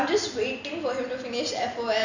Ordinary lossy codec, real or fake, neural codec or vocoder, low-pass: none; real; none; 7.2 kHz